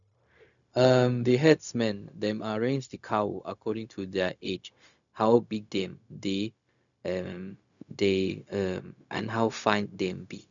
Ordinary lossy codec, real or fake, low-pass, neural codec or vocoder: none; fake; 7.2 kHz; codec, 16 kHz, 0.4 kbps, LongCat-Audio-Codec